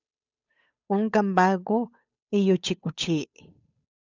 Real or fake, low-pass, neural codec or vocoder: fake; 7.2 kHz; codec, 16 kHz, 8 kbps, FunCodec, trained on Chinese and English, 25 frames a second